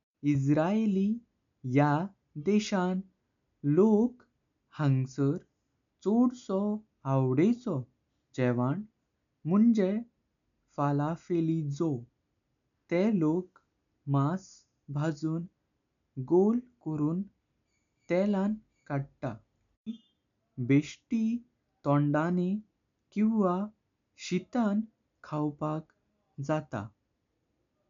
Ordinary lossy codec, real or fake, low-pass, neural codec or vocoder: MP3, 96 kbps; real; 7.2 kHz; none